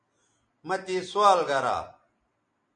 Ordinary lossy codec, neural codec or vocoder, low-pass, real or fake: MP3, 48 kbps; none; 9.9 kHz; real